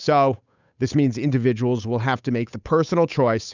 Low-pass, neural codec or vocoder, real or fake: 7.2 kHz; codec, 16 kHz, 8 kbps, FunCodec, trained on Chinese and English, 25 frames a second; fake